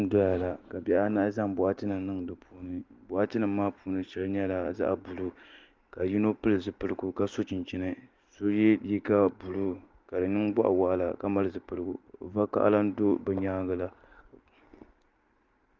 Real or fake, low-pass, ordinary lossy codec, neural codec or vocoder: real; 7.2 kHz; Opus, 32 kbps; none